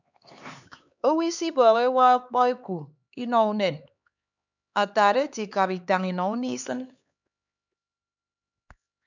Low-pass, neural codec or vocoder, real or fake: 7.2 kHz; codec, 16 kHz, 4 kbps, X-Codec, HuBERT features, trained on LibriSpeech; fake